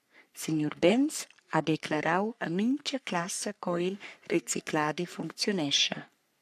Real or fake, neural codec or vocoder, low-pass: fake; codec, 44.1 kHz, 3.4 kbps, Pupu-Codec; 14.4 kHz